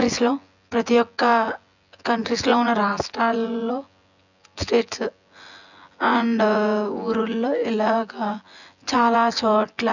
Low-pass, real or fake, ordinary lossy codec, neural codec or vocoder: 7.2 kHz; fake; none; vocoder, 24 kHz, 100 mel bands, Vocos